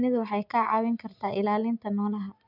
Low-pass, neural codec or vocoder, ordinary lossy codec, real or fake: 5.4 kHz; none; none; real